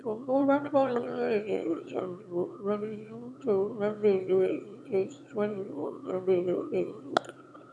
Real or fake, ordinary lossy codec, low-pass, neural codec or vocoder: fake; none; none; autoencoder, 22.05 kHz, a latent of 192 numbers a frame, VITS, trained on one speaker